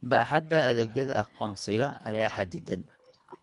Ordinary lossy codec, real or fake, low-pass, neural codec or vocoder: none; fake; 10.8 kHz; codec, 24 kHz, 1.5 kbps, HILCodec